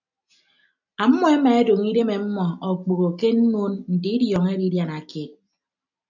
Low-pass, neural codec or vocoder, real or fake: 7.2 kHz; none; real